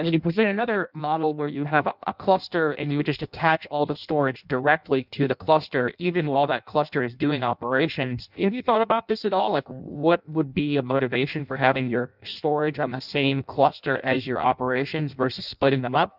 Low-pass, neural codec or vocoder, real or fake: 5.4 kHz; codec, 16 kHz in and 24 kHz out, 0.6 kbps, FireRedTTS-2 codec; fake